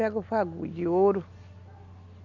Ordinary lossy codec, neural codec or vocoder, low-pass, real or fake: none; none; 7.2 kHz; real